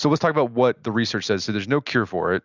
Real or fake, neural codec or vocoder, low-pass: real; none; 7.2 kHz